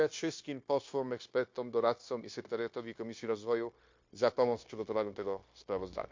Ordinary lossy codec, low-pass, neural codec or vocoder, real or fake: MP3, 48 kbps; 7.2 kHz; codec, 16 kHz, 0.9 kbps, LongCat-Audio-Codec; fake